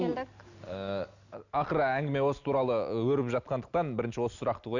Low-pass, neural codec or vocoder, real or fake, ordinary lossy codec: 7.2 kHz; none; real; none